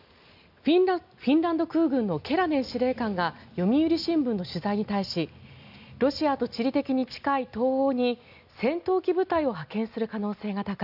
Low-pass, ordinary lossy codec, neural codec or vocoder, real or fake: 5.4 kHz; none; none; real